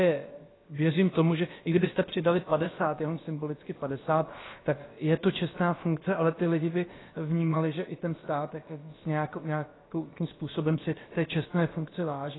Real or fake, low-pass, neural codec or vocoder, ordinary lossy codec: fake; 7.2 kHz; codec, 16 kHz, about 1 kbps, DyCAST, with the encoder's durations; AAC, 16 kbps